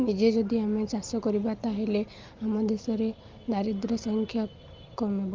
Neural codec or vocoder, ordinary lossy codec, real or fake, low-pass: none; Opus, 24 kbps; real; 7.2 kHz